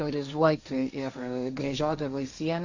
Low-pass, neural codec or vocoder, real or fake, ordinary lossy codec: 7.2 kHz; codec, 16 kHz, 1.1 kbps, Voila-Tokenizer; fake; Opus, 64 kbps